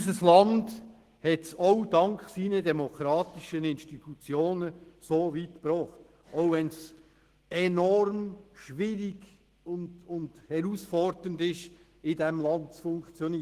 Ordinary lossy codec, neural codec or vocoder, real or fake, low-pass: Opus, 16 kbps; autoencoder, 48 kHz, 128 numbers a frame, DAC-VAE, trained on Japanese speech; fake; 14.4 kHz